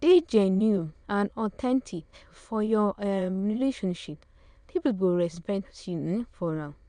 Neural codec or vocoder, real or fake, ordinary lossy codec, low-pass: autoencoder, 22.05 kHz, a latent of 192 numbers a frame, VITS, trained on many speakers; fake; none; 9.9 kHz